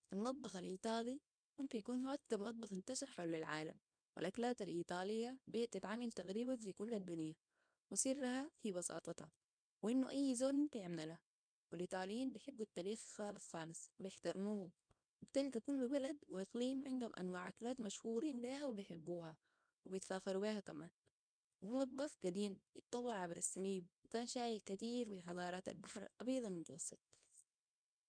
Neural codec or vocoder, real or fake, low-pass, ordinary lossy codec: codec, 24 kHz, 0.9 kbps, WavTokenizer, small release; fake; 9.9 kHz; none